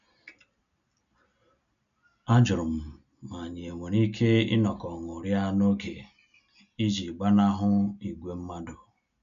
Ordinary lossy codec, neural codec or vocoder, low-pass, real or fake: none; none; 7.2 kHz; real